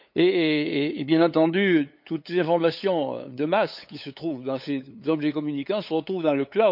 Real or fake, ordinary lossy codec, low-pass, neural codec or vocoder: fake; none; 5.4 kHz; codec, 16 kHz, 8 kbps, FunCodec, trained on LibriTTS, 25 frames a second